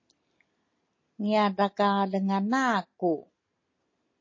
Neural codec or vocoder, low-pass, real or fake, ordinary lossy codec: none; 7.2 kHz; real; MP3, 32 kbps